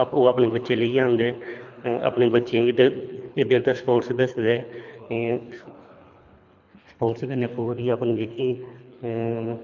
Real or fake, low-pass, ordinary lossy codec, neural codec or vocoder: fake; 7.2 kHz; none; codec, 24 kHz, 3 kbps, HILCodec